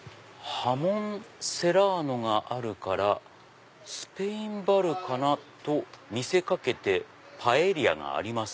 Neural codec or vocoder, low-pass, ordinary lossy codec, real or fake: none; none; none; real